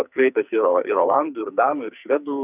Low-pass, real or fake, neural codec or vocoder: 3.6 kHz; fake; codec, 44.1 kHz, 2.6 kbps, SNAC